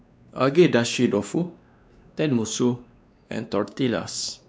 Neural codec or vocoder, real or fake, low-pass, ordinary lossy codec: codec, 16 kHz, 2 kbps, X-Codec, WavLM features, trained on Multilingual LibriSpeech; fake; none; none